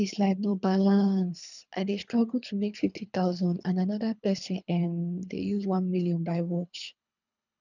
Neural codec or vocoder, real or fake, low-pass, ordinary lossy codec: codec, 24 kHz, 3 kbps, HILCodec; fake; 7.2 kHz; none